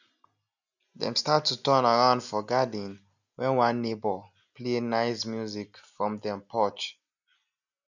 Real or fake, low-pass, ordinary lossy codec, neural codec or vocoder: real; 7.2 kHz; none; none